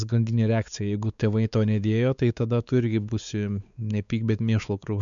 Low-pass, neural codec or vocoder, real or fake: 7.2 kHz; codec, 16 kHz, 4 kbps, X-Codec, WavLM features, trained on Multilingual LibriSpeech; fake